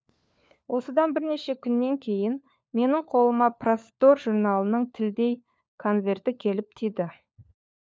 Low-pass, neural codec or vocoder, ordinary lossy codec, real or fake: none; codec, 16 kHz, 4 kbps, FunCodec, trained on LibriTTS, 50 frames a second; none; fake